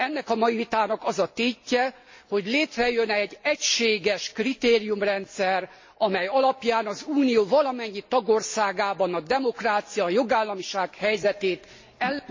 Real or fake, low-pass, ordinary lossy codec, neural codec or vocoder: real; 7.2 kHz; MP3, 32 kbps; none